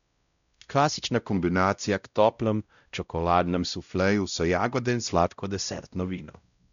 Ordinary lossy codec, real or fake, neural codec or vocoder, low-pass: none; fake; codec, 16 kHz, 0.5 kbps, X-Codec, WavLM features, trained on Multilingual LibriSpeech; 7.2 kHz